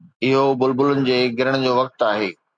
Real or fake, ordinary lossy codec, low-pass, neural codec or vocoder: real; MP3, 96 kbps; 9.9 kHz; none